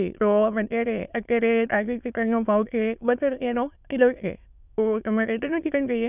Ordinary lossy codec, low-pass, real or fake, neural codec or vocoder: none; 3.6 kHz; fake; autoencoder, 22.05 kHz, a latent of 192 numbers a frame, VITS, trained on many speakers